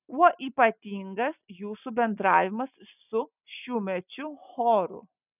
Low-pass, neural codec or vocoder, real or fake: 3.6 kHz; none; real